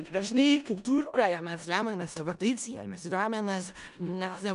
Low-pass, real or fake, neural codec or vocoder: 10.8 kHz; fake; codec, 16 kHz in and 24 kHz out, 0.4 kbps, LongCat-Audio-Codec, four codebook decoder